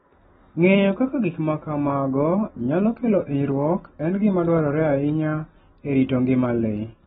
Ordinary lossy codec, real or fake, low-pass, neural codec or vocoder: AAC, 16 kbps; real; 7.2 kHz; none